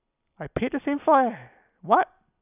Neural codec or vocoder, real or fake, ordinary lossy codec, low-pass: none; real; none; 3.6 kHz